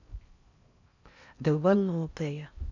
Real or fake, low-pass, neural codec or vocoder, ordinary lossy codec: fake; 7.2 kHz; codec, 16 kHz in and 24 kHz out, 0.6 kbps, FocalCodec, streaming, 4096 codes; none